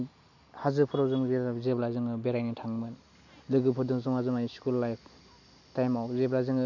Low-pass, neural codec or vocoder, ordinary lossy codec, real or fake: 7.2 kHz; none; none; real